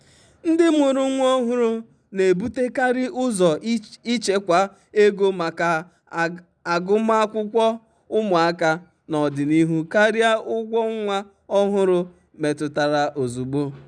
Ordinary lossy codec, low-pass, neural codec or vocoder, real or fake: none; 9.9 kHz; none; real